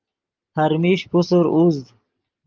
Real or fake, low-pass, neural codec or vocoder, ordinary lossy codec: real; 7.2 kHz; none; Opus, 24 kbps